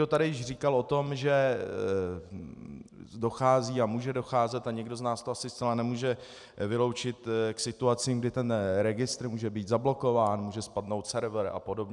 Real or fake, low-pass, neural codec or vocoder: real; 10.8 kHz; none